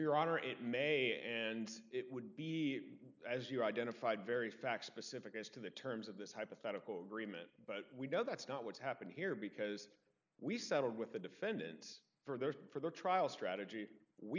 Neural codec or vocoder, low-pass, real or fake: none; 7.2 kHz; real